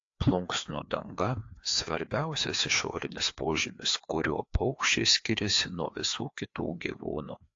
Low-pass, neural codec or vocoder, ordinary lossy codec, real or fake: 7.2 kHz; codec, 16 kHz, 2 kbps, FreqCodec, larger model; AAC, 48 kbps; fake